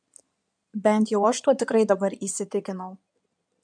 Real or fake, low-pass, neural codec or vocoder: fake; 9.9 kHz; codec, 16 kHz in and 24 kHz out, 2.2 kbps, FireRedTTS-2 codec